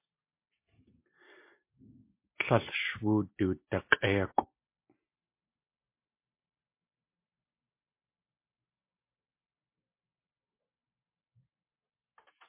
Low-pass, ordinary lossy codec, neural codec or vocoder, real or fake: 3.6 kHz; MP3, 24 kbps; none; real